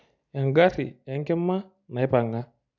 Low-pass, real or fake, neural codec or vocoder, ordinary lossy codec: 7.2 kHz; real; none; none